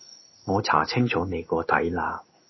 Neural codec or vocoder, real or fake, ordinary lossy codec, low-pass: codec, 16 kHz in and 24 kHz out, 1 kbps, XY-Tokenizer; fake; MP3, 24 kbps; 7.2 kHz